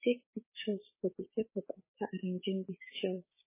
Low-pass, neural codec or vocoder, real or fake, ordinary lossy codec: 3.6 kHz; codec, 16 kHz, 6 kbps, DAC; fake; MP3, 16 kbps